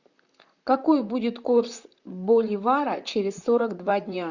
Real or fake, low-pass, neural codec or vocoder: fake; 7.2 kHz; vocoder, 44.1 kHz, 128 mel bands, Pupu-Vocoder